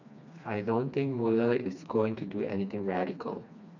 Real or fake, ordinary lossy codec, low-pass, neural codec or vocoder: fake; none; 7.2 kHz; codec, 16 kHz, 2 kbps, FreqCodec, smaller model